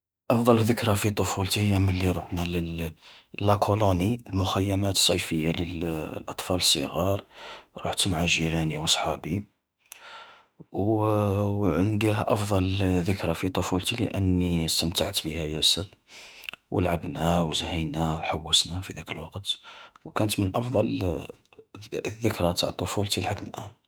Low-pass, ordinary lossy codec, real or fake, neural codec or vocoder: none; none; fake; autoencoder, 48 kHz, 32 numbers a frame, DAC-VAE, trained on Japanese speech